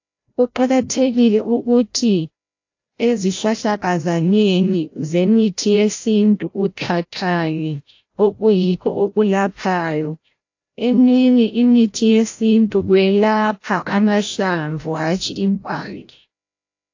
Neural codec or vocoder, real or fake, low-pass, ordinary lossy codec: codec, 16 kHz, 0.5 kbps, FreqCodec, larger model; fake; 7.2 kHz; AAC, 48 kbps